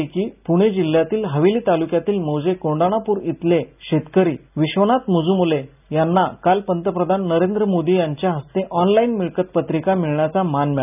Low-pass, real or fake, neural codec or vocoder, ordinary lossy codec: 3.6 kHz; real; none; none